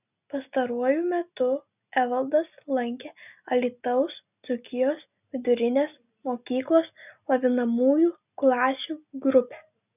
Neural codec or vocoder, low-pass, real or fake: none; 3.6 kHz; real